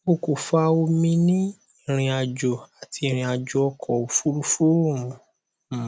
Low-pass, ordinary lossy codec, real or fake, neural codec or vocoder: none; none; real; none